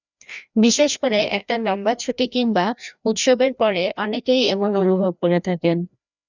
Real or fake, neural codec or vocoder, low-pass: fake; codec, 16 kHz, 1 kbps, FreqCodec, larger model; 7.2 kHz